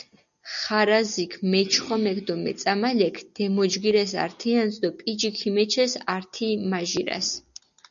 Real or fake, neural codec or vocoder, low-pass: real; none; 7.2 kHz